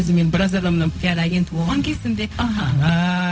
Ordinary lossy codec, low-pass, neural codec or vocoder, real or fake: none; none; codec, 16 kHz, 0.4 kbps, LongCat-Audio-Codec; fake